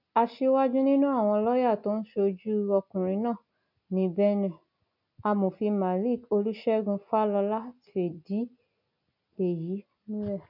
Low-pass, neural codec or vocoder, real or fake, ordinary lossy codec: 5.4 kHz; none; real; none